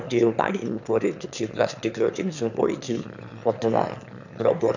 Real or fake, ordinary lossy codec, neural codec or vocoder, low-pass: fake; none; autoencoder, 22.05 kHz, a latent of 192 numbers a frame, VITS, trained on one speaker; 7.2 kHz